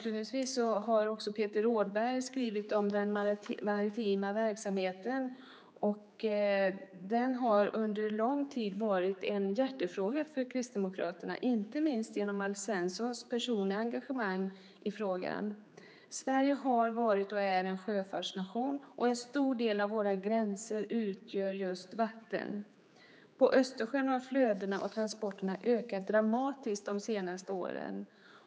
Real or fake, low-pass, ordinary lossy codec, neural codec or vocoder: fake; none; none; codec, 16 kHz, 4 kbps, X-Codec, HuBERT features, trained on general audio